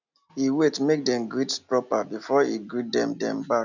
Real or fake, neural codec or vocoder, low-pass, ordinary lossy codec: real; none; 7.2 kHz; none